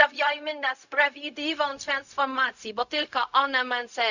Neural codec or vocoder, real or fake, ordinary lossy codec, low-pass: codec, 16 kHz, 0.4 kbps, LongCat-Audio-Codec; fake; none; 7.2 kHz